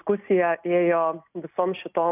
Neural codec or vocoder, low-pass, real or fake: none; 3.6 kHz; real